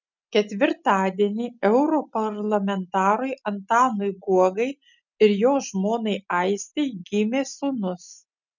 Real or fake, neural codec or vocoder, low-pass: real; none; 7.2 kHz